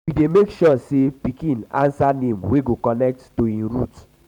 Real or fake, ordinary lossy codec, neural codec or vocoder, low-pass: fake; none; vocoder, 44.1 kHz, 128 mel bands every 512 samples, BigVGAN v2; 19.8 kHz